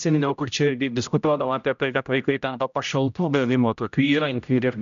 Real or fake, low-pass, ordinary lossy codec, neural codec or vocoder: fake; 7.2 kHz; MP3, 96 kbps; codec, 16 kHz, 0.5 kbps, X-Codec, HuBERT features, trained on general audio